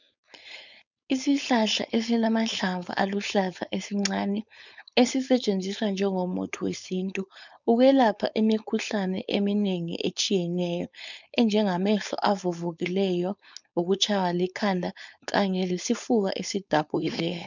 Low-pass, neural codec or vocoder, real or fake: 7.2 kHz; codec, 16 kHz, 4.8 kbps, FACodec; fake